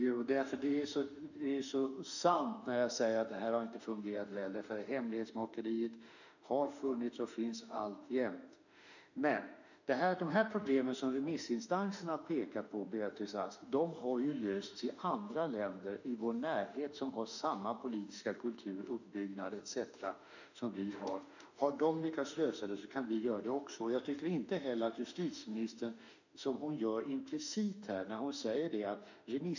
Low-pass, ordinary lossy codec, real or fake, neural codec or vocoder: 7.2 kHz; none; fake; autoencoder, 48 kHz, 32 numbers a frame, DAC-VAE, trained on Japanese speech